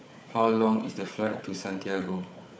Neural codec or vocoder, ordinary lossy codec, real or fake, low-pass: codec, 16 kHz, 4 kbps, FunCodec, trained on Chinese and English, 50 frames a second; none; fake; none